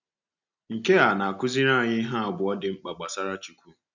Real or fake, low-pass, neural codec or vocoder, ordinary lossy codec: real; 7.2 kHz; none; none